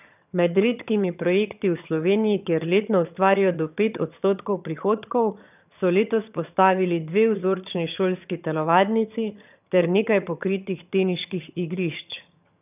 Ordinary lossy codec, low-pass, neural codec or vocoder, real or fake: none; 3.6 kHz; vocoder, 22.05 kHz, 80 mel bands, HiFi-GAN; fake